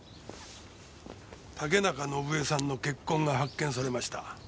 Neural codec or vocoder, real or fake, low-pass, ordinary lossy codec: none; real; none; none